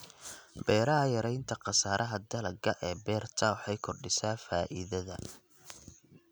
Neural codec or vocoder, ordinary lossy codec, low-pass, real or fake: none; none; none; real